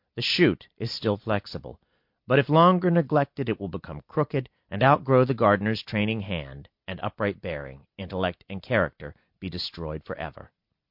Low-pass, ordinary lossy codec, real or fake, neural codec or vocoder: 5.4 kHz; MP3, 48 kbps; real; none